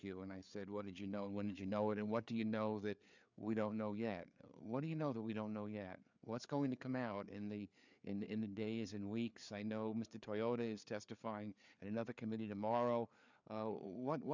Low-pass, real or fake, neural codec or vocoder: 7.2 kHz; fake; codec, 16 kHz, 4 kbps, FreqCodec, larger model